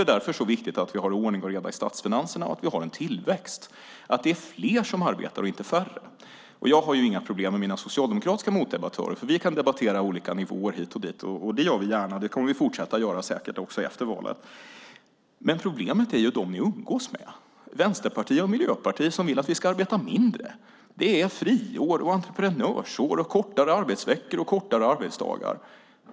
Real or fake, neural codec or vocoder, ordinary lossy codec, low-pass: real; none; none; none